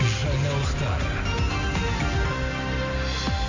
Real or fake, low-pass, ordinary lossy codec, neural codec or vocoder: real; 7.2 kHz; MP3, 48 kbps; none